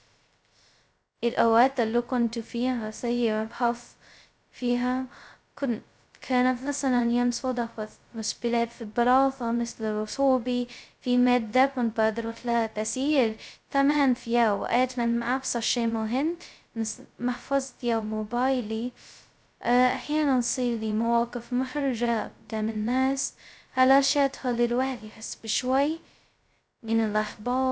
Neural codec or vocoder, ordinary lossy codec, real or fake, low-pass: codec, 16 kHz, 0.2 kbps, FocalCodec; none; fake; none